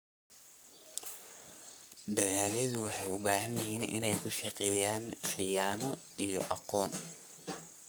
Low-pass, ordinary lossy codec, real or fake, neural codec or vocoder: none; none; fake; codec, 44.1 kHz, 3.4 kbps, Pupu-Codec